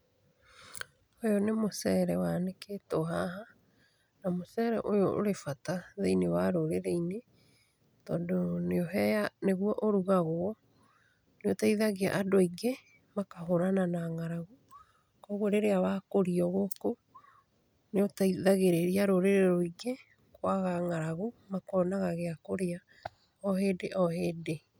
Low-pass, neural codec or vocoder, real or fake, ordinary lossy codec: none; none; real; none